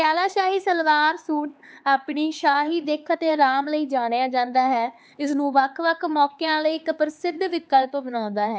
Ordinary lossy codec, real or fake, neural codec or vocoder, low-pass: none; fake; codec, 16 kHz, 4 kbps, X-Codec, HuBERT features, trained on LibriSpeech; none